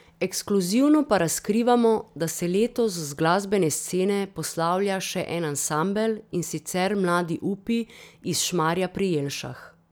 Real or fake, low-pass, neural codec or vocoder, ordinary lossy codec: real; none; none; none